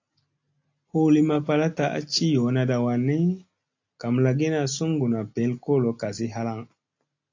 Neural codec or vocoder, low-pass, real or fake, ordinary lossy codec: none; 7.2 kHz; real; AAC, 48 kbps